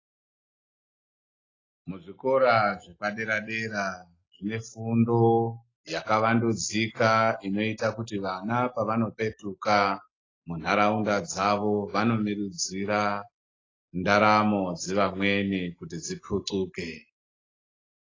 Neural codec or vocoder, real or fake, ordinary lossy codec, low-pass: none; real; AAC, 32 kbps; 7.2 kHz